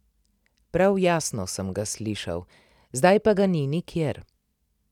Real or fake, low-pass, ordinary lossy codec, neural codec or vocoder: real; 19.8 kHz; none; none